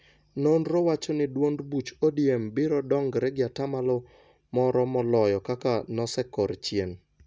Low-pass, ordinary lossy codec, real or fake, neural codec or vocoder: none; none; real; none